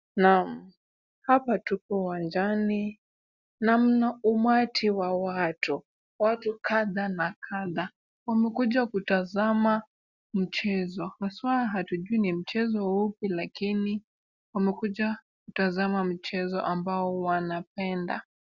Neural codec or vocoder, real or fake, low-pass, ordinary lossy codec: none; real; 7.2 kHz; Opus, 64 kbps